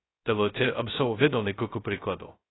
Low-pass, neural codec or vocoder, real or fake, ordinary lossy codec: 7.2 kHz; codec, 16 kHz, 0.2 kbps, FocalCodec; fake; AAC, 16 kbps